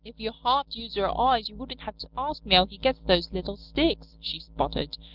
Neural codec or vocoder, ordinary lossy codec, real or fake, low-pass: none; Opus, 24 kbps; real; 5.4 kHz